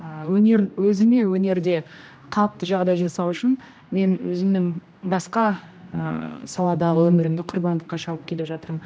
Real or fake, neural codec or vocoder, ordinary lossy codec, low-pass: fake; codec, 16 kHz, 1 kbps, X-Codec, HuBERT features, trained on general audio; none; none